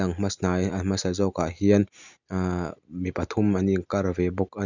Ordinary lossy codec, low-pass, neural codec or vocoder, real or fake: none; 7.2 kHz; none; real